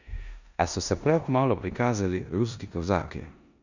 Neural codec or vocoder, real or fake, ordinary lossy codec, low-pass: codec, 16 kHz in and 24 kHz out, 0.9 kbps, LongCat-Audio-Codec, four codebook decoder; fake; none; 7.2 kHz